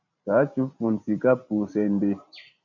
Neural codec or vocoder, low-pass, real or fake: vocoder, 44.1 kHz, 128 mel bands every 512 samples, BigVGAN v2; 7.2 kHz; fake